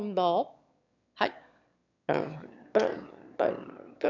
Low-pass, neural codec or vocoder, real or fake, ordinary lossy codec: 7.2 kHz; autoencoder, 22.05 kHz, a latent of 192 numbers a frame, VITS, trained on one speaker; fake; none